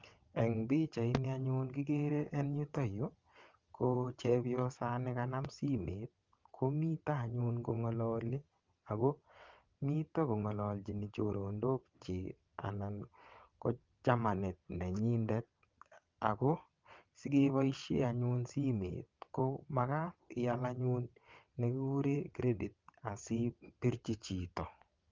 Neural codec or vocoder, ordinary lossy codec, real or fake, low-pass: vocoder, 22.05 kHz, 80 mel bands, WaveNeXt; Opus, 32 kbps; fake; 7.2 kHz